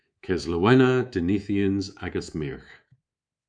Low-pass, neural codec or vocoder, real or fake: 9.9 kHz; codec, 24 kHz, 3.1 kbps, DualCodec; fake